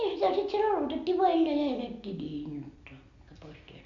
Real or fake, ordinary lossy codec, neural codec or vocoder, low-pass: real; AAC, 64 kbps; none; 7.2 kHz